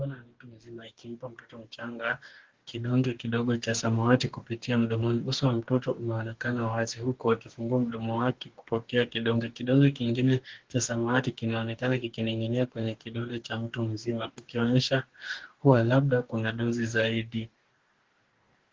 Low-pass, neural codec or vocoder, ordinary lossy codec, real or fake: 7.2 kHz; codec, 44.1 kHz, 2.6 kbps, DAC; Opus, 16 kbps; fake